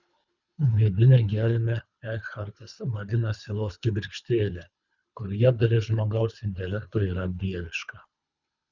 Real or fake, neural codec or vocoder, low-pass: fake; codec, 24 kHz, 3 kbps, HILCodec; 7.2 kHz